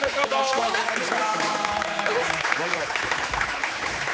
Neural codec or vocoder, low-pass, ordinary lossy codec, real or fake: codec, 16 kHz, 4 kbps, X-Codec, HuBERT features, trained on general audio; none; none; fake